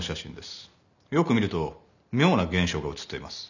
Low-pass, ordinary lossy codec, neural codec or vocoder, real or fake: 7.2 kHz; none; none; real